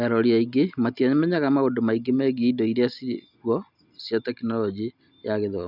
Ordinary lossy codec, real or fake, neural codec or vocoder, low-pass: none; real; none; 5.4 kHz